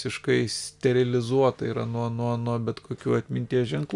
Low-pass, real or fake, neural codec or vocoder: 10.8 kHz; real; none